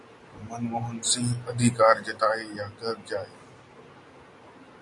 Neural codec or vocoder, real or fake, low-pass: none; real; 10.8 kHz